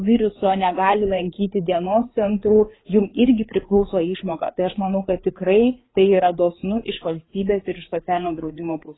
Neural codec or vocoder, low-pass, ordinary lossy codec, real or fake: codec, 16 kHz, 4 kbps, FreqCodec, larger model; 7.2 kHz; AAC, 16 kbps; fake